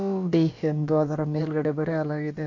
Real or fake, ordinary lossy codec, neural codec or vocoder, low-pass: fake; AAC, 48 kbps; codec, 16 kHz, about 1 kbps, DyCAST, with the encoder's durations; 7.2 kHz